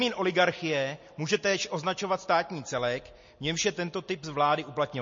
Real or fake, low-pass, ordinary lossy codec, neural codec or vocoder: real; 7.2 kHz; MP3, 32 kbps; none